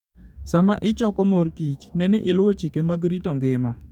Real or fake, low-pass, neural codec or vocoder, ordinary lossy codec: fake; 19.8 kHz; codec, 44.1 kHz, 2.6 kbps, DAC; none